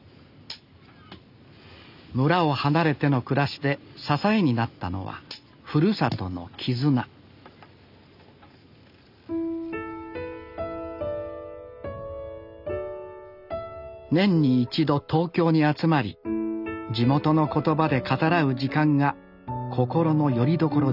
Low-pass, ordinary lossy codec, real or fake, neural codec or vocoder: 5.4 kHz; none; real; none